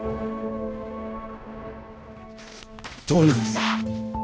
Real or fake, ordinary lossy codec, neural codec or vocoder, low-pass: fake; none; codec, 16 kHz, 1 kbps, X-Codec, HuBERT features, trained on balanced general audio; none